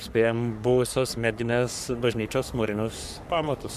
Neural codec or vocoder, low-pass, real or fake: codec, 44.1 kHz, 3.4 kbps, Pupu-Codec; 14.4 kHz; fake